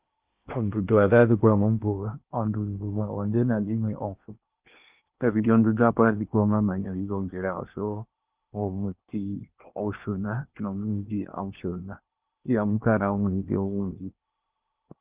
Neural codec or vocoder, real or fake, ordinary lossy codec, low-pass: codec, 16 kHz in and 24 kHz out, 0.8 kbps, FocalCodec, streaming, 65536 codes; fake; Opus, 24 kbps; 3.6 kHz